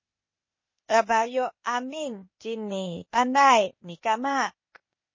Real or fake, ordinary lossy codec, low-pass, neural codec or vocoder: fake; MP3, 32 kbps; 7.2 kHz; codec, 16 kHz, 0.8 kbps, ZipCodec